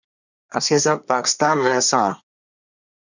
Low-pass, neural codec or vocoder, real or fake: 7.2 kHz; codec, 24 kHz, 1 kbps, SNAC; fake